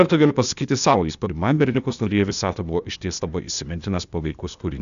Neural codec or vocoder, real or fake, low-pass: codec, 16 kHz, 0.8 kbps, ZipCodec; fake; 7.2 kHz